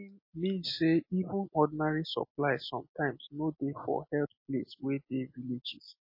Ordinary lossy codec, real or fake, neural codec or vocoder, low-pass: MP3, 24 kbps; real; none; 5.4 kHz